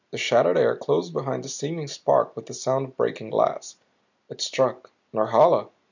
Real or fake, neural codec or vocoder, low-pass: real; none; 7.2 kHz